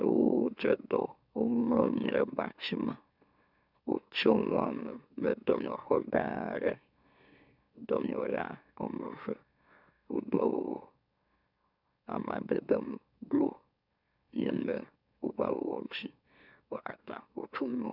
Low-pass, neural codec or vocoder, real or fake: 5.4 kHz; autoencoder, 44.1 kHz, a latent of 192 numbers a frame, MeloTTS; fake